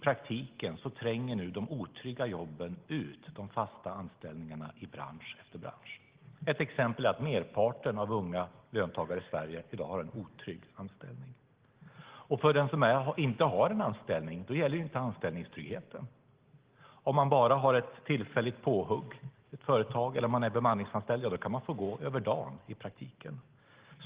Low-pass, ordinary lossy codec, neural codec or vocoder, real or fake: 3.6 kHz; Opus, 16 kbps; none; real